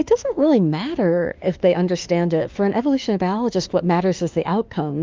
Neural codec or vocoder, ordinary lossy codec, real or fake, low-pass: autoencoder, 48 kHz, 32 numbers a frame, DAC-VAE, trained on Japanese speech; Opus, 24 kbps; fake; 7.2 kHz